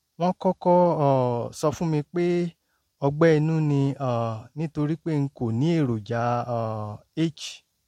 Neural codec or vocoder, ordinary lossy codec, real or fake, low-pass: none; MP3, 64 kbps; real; 19.8 kHz